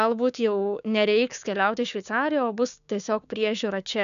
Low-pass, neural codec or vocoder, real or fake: 7.2 kHz; codec, 16 kHz, 6 kbps, DAC; fake